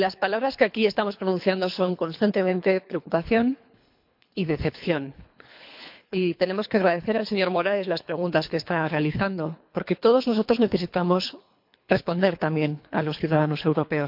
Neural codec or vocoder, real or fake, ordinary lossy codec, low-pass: codec, 24 kHz, 3 kbps, HILCodec; fake; MP3, 48 kbps; 5.4 kHz